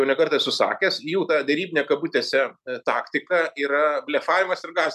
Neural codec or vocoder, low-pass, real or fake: none; 14.4 kHz; real